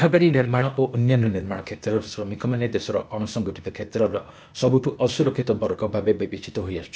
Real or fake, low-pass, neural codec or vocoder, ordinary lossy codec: fake; none; codec, 16 kHz, 0.8 kbps, ZipCodec; none